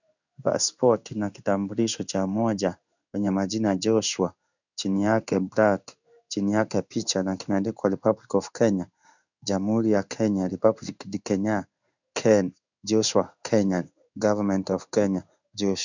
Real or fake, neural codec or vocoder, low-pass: fake; codec, 16 kHz in and 24 kHz out, 1 kbps, XY-Tokenizer; 7.2 kHz